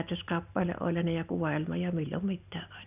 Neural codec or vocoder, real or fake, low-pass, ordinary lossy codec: none; real; 3.6 kHz; none